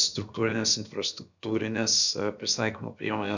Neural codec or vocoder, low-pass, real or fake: codec, 16 kHz, about 1 kbps, DyCAST, with the encoder's durations; 7.2 kHz; fake